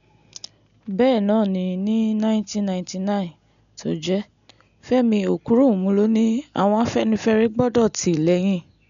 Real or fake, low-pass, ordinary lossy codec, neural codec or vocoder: real; 7.2 kHz; none; none